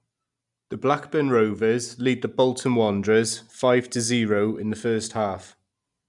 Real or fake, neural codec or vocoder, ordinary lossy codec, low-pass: real; none; MP3, 96 kbps; 10.8 kHz